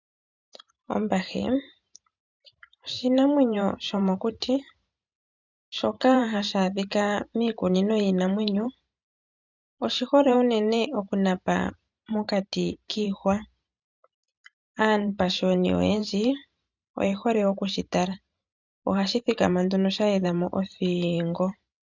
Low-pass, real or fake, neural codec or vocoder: 7.2 kHz; fake; vocoder, 44.1 kHz, 128 mel bands every 512 samples, BigVGAN v2